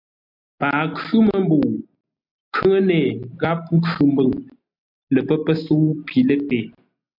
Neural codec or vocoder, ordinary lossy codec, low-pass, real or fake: none; MP3, 48 kbps; 5.4 kHz; real